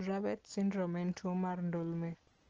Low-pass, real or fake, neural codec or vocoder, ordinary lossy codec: 7.2 kHz; real; none; Opus, 16 kbps